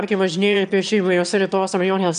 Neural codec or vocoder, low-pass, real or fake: autoencoder, 22.05 kHz, a latent of 192 numbers a frame, VITS, trained on one speaker; 9.9 kHz; fake